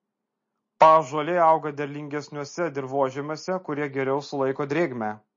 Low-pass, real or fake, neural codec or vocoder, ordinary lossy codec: 7.2 kHz; real; none; MP3, 32 kbps